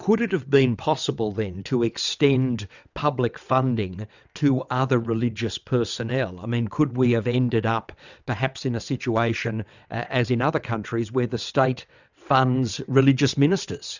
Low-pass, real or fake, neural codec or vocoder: 7.2 kHz; fake; vocoder, 22.05 kHz, 80 mel bands, WaveNeXt